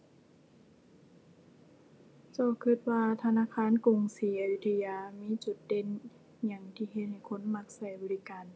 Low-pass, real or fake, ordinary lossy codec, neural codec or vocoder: none; real; none; none